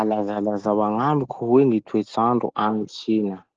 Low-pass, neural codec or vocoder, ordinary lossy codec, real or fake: 7.2 kHz; none; Opus, 16 kbps; real